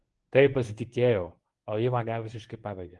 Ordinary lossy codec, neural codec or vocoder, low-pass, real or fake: Opus, 24 kbps; codec, 24 kHz, 0.9 kbps, WavTokenizer, medium speech release version 1; 10.8 kHz; fake